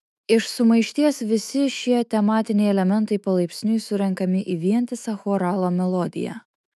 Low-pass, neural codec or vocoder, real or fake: 14.4 kHz; autoencoder, 48 kHz, 128 numbers a frame, DAC-VAE, trained on Japanese speech; fake